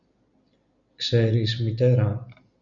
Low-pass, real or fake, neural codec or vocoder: 7.2 kHz; real; none